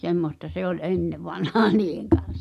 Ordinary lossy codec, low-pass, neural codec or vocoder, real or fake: none; 14.4 kHz; none; real